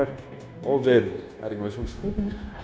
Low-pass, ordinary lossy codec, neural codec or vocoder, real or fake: none; none; codec, 16 kHz, 0.9 kbps, LongCat-Audio-Codec; fake